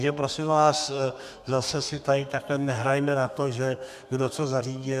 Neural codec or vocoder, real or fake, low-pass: codec, 32 kHz, 1.9 kbps, SNAC; fake; 14.4 kHz